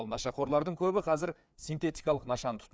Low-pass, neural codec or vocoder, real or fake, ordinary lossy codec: none; codec, 16 kHz, 4 kbps, FreqCodec, larger model; fake; none